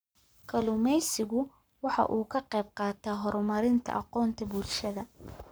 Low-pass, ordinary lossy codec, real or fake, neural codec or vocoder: none; none; fake; codec, 44.1 kHz, 7.8 kbps, Pupu-Codec